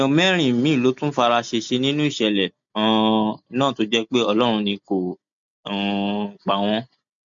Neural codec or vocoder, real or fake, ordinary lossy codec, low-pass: none; real; MP3, 48 kbps; 7.2 kHz